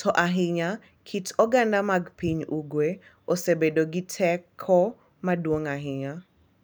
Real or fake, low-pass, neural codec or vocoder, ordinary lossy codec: real; none; none; none